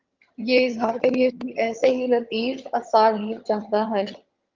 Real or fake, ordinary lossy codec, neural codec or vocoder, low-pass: fake; Opus, 24 kbps; vocoder, 22.05 kHz, 80 mel bands, HiFi-GAN; 7.2 kHz